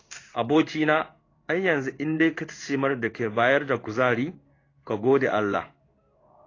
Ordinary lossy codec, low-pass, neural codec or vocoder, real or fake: AAC, 32 kbps; 7.2 kHz; codec, 16 kHz in and 24 kHz out, 1 kbps, XY-Tokenizer; fake